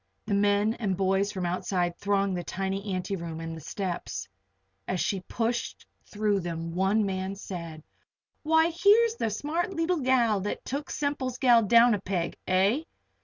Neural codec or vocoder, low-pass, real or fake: none; 7.2 kHz; real